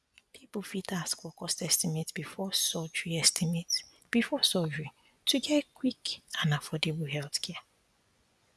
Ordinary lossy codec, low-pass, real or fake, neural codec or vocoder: none; none; fake; vocoder, 24 kHz, 100 mel bands, Vocos